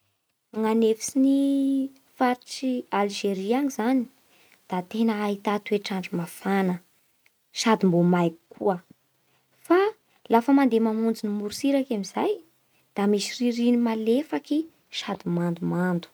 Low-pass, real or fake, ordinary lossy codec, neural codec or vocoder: none; real; none; none